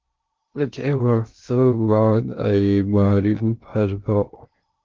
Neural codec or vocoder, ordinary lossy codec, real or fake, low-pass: codec, 16 kHz in and 24 kHz out, 0.6 kbps, FocalCodec, streaming, 2048 codes; Opus, 32 kbps; fake; 7.2 kHz